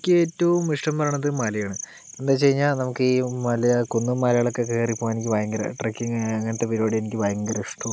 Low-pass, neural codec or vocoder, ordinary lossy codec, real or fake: none; none; none; real